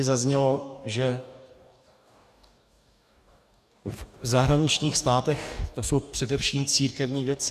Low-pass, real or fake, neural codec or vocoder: 14.4 kHz; fake; codec, 44.1 kHz, 2.6 kbps, DAC